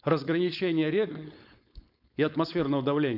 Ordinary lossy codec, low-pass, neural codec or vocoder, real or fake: none; 5.4 kHz; codec, 16 kHz, 4.8 kbps, FACodec; fake